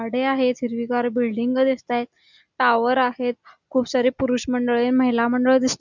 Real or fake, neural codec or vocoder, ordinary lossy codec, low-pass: real; none; none; 7.2 kHz